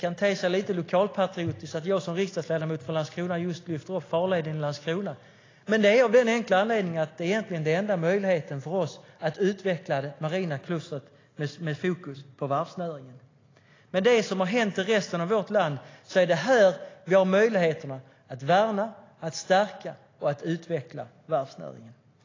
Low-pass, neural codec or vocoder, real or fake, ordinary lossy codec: 7.2 kHz; none; real; AAC, 32 kbps